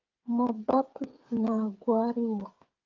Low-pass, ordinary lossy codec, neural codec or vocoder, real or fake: 7.2 kHz; Opus, 32 kbps; codec, 16 kHz, 8 kbps, FreqCodec, smaller model; fake